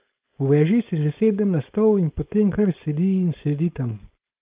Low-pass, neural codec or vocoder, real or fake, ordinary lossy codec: 3.6 kHz; codec, 16 kHz, 4.8 kbps, FACodec; fake; Opus, 32 kbps